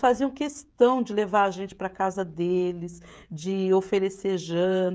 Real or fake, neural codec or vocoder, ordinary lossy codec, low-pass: fake; codec, 16 kHz, 16 kbps, FreqCodec, smaller model; none; none